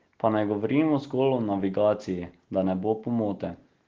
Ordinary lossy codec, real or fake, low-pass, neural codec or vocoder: Opus, 16 kbps; real; 7.2 kHz; none